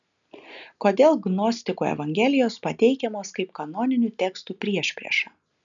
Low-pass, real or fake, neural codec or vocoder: 7.2 kHz; real; none